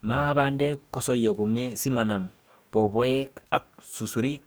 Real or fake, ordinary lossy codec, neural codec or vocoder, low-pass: fake; none; codec, 44.1 kHz, 2.6 kbps, DAC; none